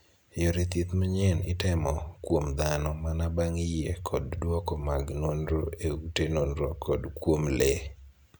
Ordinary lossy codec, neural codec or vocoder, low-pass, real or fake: none; none; none; real